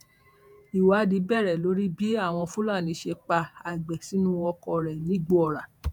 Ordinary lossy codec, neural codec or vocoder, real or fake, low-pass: none; vocoder, 48 kHz, 128 mel bands, Vocos; fake; 19.8 kHz